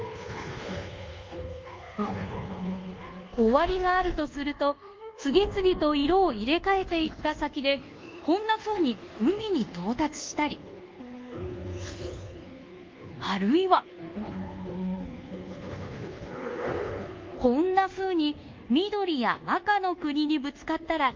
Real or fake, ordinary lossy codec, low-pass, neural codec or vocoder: fake; Opus, 32 kbps; 7.2 kHz; codec, 24 kHz, 1.2 kbps, DualCodec